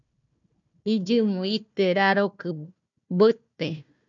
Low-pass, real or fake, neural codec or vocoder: 7.2 kHz; fake; codec, 16 kHz, 1 kbps, FunCodec, trained on Chinese and English, 50 frames a second